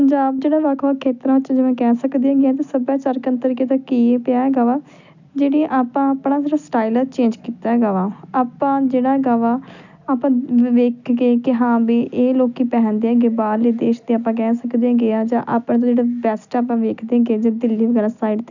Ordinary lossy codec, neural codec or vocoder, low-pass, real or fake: none; none; 7.2 kHz; real